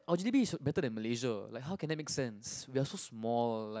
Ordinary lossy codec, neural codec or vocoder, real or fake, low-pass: none; none; real; none